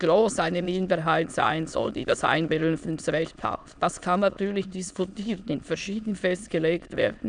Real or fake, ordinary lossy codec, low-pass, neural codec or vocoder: fake; none; 9.9 kHz; autoencoder, 22.05 kHz, a latent of 192 numbers a frame, VITS, trained on many speakers